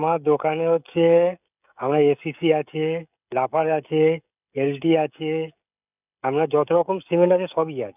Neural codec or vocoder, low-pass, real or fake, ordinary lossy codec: codec, 16 kHz, 16 kbps, FreqCodec, smaller model; 3.6 kHz; fake; none